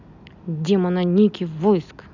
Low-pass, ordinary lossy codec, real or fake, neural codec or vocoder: 7.2 kHz; none; real; none